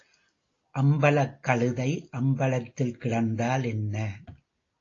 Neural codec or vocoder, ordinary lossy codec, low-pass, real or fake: none; AAC, 32 kbps; 7.2 kHz; real